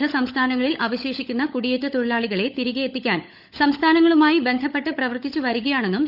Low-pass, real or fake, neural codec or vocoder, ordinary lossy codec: 5.4 kHz; fake; codec, 16 kHz, 16 kbps, FunCodec, trained on LibriTTS, 50 frames a second; none